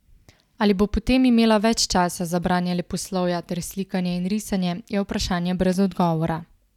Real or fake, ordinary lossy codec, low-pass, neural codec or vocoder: real; none; 19.8 kHz; none